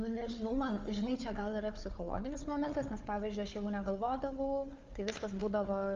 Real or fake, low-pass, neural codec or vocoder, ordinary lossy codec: fake; 7.2 kHz; codec, 16 kHz, 4 kbps, FunCodec, trained on Chinese and English, 50 frames a second; Opus, 32 kbps